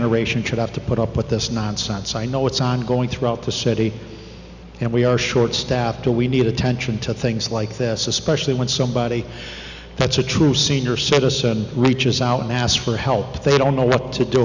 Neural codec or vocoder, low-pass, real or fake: none; 7.2 kHz; real